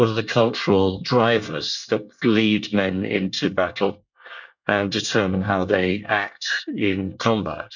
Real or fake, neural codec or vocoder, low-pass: fake; codec, 24 kHz, 1 kbps, SNAC; 7.2 kHz